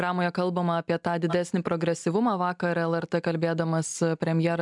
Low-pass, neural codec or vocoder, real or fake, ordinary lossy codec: 10.8 kHz; none; real; MP3, 96 kbps